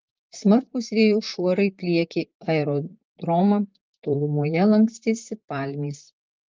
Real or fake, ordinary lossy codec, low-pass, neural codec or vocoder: fake; Opus, 24 kbps; 7.2 kHz; vocoder, 44.1 kHz, 128 mel bands, Pupu-Vocoder